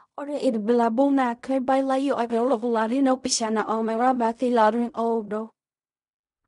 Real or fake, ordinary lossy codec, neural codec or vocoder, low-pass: fake; MP3, 96 kbps; codec, 16 kHz in and 24 kHz out, 0.4 kbps, LongCat-Audio-Codec, fine tuned four codebook decoder; 10.8 kHz